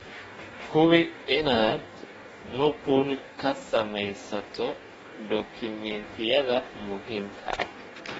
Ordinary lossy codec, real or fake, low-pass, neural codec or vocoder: AAC, 24 kbps; fake; 19.8 kHz; codec, 44.1 kHz, 2.6 kbps, DAC